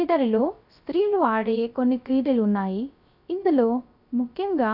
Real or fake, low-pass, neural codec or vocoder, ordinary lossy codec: fake; 5.4 kHz; codec, 16 kHz, 0.3 kbps, FocalCodec; none